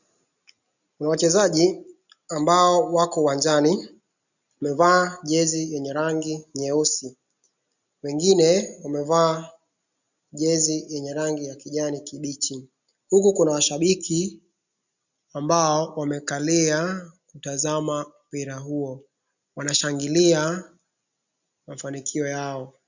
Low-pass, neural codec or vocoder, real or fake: 7.2 kHz; none; real